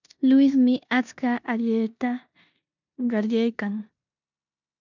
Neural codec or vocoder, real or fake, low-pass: codec, 16 kHz in and 24 kHz out, 0.9 kbps, LongCat-Audio-Codec, four codebook decoder; fake; 7.2 kHz